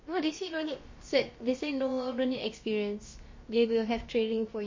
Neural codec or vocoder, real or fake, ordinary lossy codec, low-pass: codec, 16 kHz, about 1 kbps, DyCAST, with the encoder's durations; fake; MP3, 32 kbps; 7.2 kHz